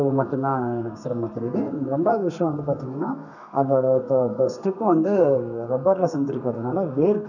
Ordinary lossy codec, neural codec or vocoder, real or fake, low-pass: none; codec, 32 kHz, 1.9 kbps, SNAC; fake; 7.2 kHz